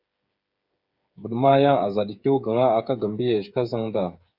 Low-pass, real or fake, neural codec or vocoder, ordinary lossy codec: 5.4 kHz; fake; codec, 16 kHz, 8 kbps, FreqCodec, smaller model; Opus, 64 kbps